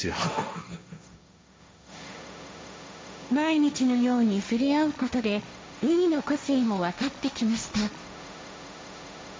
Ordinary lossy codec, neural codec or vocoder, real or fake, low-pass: none; codec, 16 kHz, 1.1 kbps, Voila-Tokenizer; fake; none